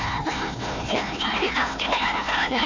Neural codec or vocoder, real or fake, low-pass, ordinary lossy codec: codec, 16 kHz, 1 kbps, FunCodec, trained on Chinese and English, 50 frames a second; fake; 7.2 kHz; none